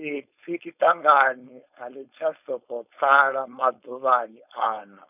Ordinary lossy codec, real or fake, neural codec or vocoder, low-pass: none; fake; codec, 16 kHz, 4.8 kbps, FACodec; 3.6 kHz